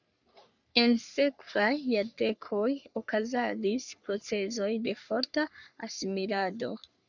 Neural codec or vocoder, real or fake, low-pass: codec, 44.1 kHz, 3.4 kbps, Pupu-Codec; fake; 7.2 kHz